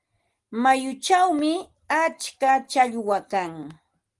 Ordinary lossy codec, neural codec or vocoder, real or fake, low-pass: Opus, 24 kbps; none; real; 10.8 kHz